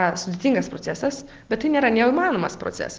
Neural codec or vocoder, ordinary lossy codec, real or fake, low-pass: none; Opus, 16 kbps; real; 7.2 kHz